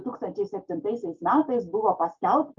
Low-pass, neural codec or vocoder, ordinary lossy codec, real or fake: 7.2 kHz; none; Opus, 24 kbps; real